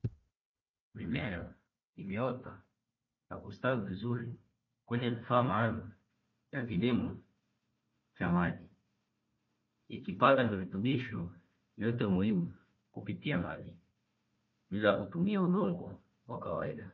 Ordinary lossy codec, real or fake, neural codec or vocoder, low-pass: MP3, 32 kbps; fake; codec, 16 kHz, 1 kbps, FunCodec, trained on Chinese and English, 50 frames a second; 7.2 kHz